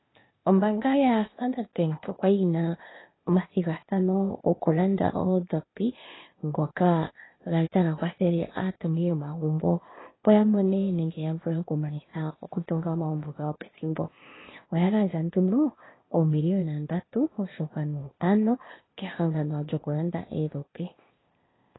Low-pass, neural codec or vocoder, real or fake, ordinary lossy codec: 7.2 kHz; codec, 16 kHz, 0.8 kbps, ZipCodec; fake; AAC, 16 kbps